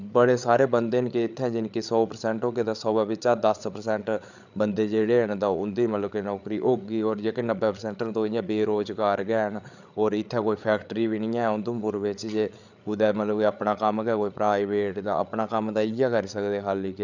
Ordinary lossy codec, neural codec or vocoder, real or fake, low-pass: none; codec, 16 kHz, 8 kbps, FreqCodec, larger model; fake; 7.2 kHz